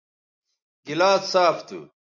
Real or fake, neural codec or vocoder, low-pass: real; none; 7.2 kHz